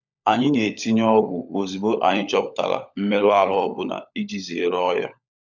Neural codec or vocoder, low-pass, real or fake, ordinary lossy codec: codec, 16 kHz, 16 kbps, FunCodec, trained on LibriTTS, 50 frames a second; 7.2 kHz; fake; none